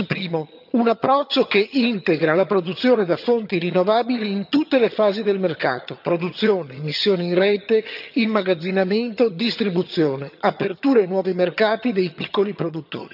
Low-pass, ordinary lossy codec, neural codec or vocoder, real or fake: 5.4 kHz; none; vocoder, 22.05 kHz, 80 mel bands, HiFi-GAN; fake